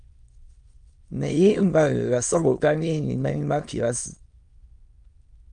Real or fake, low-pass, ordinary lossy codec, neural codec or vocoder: fake; 9.9 kHz; Opus, 32 kbps; autoencoder, 22.05 kHz, a latent of 192 numbers a frame, VITS, trained on many speakers